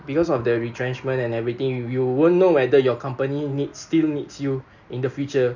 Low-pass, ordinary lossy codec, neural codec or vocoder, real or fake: 7.2 kHz; none; none; real